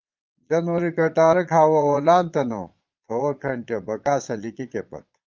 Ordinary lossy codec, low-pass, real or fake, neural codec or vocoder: Opus, 24 kbps; 7.2 kHz; fake; vocoder, 22.05 kHz, 80 mel bands, Vocos